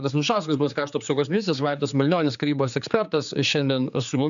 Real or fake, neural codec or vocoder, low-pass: fake; codec, 16 kHz, 4 kbps, X-Codec, HuBERT features, trained on general audio; 7.2 kHz